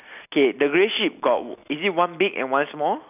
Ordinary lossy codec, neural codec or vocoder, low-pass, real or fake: none; none; 3.6 kHz; real